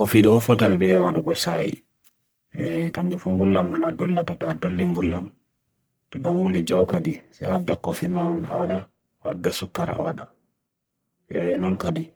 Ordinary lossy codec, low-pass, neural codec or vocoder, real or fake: none; none; codec, 44.1 kHz, 1.7 kbps, Pupu-Codec; fake